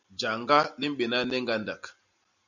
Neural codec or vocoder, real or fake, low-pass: none; real; 7.2 kHz